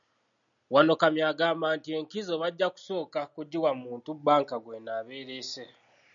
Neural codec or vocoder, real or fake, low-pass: none; real; 7.2 kHz